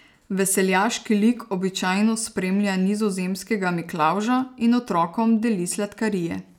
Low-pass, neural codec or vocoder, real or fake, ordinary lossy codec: 19.8 kHz; none; real; none